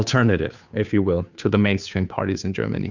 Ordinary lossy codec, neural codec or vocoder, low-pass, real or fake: Opus, 64 kbps; codec, 16 kHz, 2 kbps, FunCodec, trained on Chinese and English, 25 frames a second; 7.2 kHz; fake